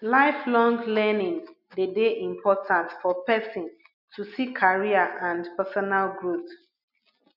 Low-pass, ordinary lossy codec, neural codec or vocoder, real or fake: 5.4 kHz; none; none; real